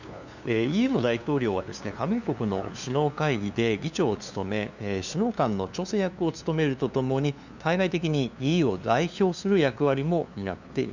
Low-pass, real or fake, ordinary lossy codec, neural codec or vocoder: 7.2 kHz; fake; none; codec, 16 kHz, 2 kbps, FunCodec, trained on LibriTTS, 25 frames a second